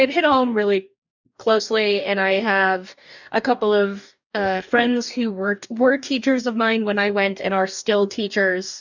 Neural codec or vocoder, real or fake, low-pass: codec, 44.1 kHz, 2.6 kbps, DAC; fake; 7.2 kHz